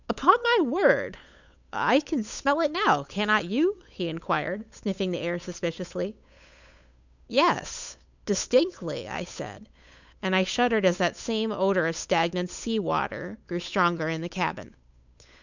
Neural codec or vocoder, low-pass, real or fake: codec, 16 kHz, 8 kbps, FunCodec, trained on Chinese and English, 25 frames a second; 7.2 kHz; fake